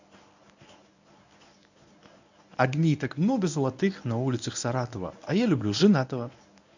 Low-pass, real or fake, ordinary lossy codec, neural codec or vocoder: 7.2 kHz; fake; none; codec, 24 kHz, 0.9 kbps, WavTokenizer, medium speech release version 1